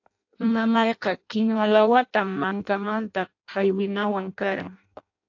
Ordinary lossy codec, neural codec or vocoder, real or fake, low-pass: AAC, 48 kbps; codec, 16 kHz in and 24 kHz out, 0.6 kbps, FireRedTTS-2 codec; fake; 7.2 kHz